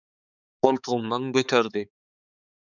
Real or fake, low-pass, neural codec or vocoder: fake; 7.2 kHz; codec, 16 kHz, 4 kbps, X-Codec, HuBERT features, trained on balanced general audio